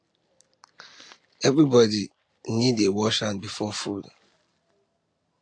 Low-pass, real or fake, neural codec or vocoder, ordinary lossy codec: 9.9 kHz; real; none; AAC, 48 kbps